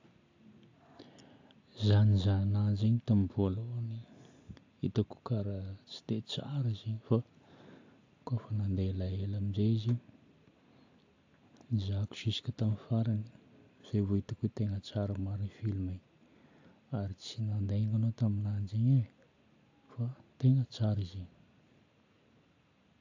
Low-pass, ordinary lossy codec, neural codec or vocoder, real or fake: 7.2 kHz; AAC, 48 kbps; none; real